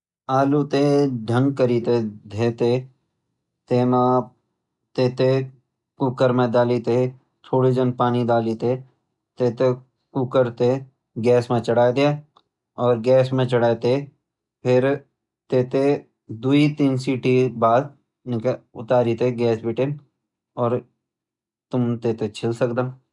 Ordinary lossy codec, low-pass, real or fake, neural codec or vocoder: none; 10.8 kHz; real; none